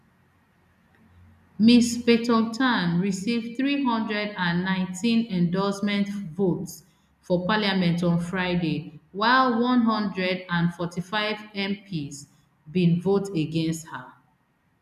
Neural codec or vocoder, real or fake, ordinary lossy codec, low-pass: none; real; none; 14.4 kHz